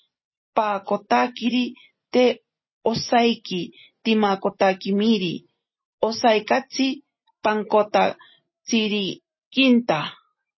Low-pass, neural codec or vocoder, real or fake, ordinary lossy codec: 7.2 kHz; none; real; MP3, 24 kbps